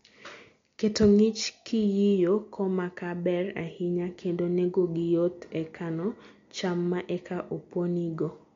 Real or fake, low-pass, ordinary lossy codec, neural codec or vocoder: real; 7.2 kHz; MP3, 48 kbps; none